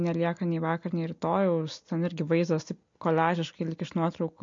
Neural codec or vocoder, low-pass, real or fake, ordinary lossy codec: none; 7.2 kHz; real; MP3, 48 kbps